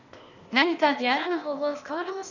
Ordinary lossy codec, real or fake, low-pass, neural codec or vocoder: none; fake; 7.2 kHz; codec, 16 kHz, 0.8 kbps, ZipCodec